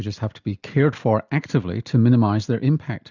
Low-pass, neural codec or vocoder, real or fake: 7.2 kHz; none; real